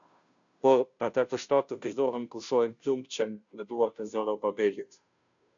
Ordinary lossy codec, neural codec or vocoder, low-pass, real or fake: MP3, 96 kbps; codec, 16 kHz, 0.5 kbps, FunCodec, trained on Chinese and English, 25 frames a second; 7.2 kHz; fake